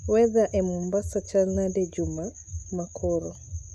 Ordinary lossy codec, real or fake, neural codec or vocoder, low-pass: AAC, 96 kbps; real; none; 14.4 kHz